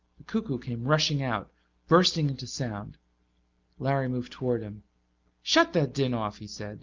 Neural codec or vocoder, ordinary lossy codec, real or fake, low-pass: none; Opus, 24 kbps; real; 7.2 kHz